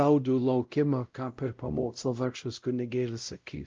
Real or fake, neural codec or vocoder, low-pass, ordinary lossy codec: fake; codec, 16 kHz, 0.5 kbps, X-Codec, WavLM features, trained on Multilingual LibriSpeech; 7.2 kHz; Opus, 24 kbps